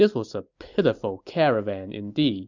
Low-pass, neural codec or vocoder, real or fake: 7.2 kHz; none; real